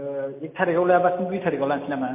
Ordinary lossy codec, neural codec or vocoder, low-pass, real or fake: MP3, 24 kbps; none; 3.6 kHz; real